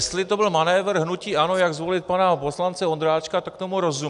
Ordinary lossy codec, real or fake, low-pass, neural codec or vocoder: AAC, 96 kbps; real; 10.8 kHz; none